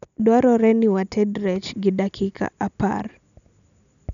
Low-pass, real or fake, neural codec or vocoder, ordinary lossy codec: 7.2 kHz; real; none; MP3, 96 kbps